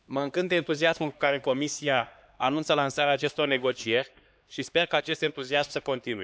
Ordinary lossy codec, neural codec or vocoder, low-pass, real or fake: none; codec, 16 kHz, 2 kbps, X-Codec, HuBERT features, trained on LibriSpeech; none; fake